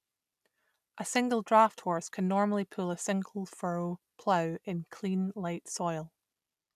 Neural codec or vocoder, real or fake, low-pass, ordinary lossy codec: none; real; 14.4 kHz; none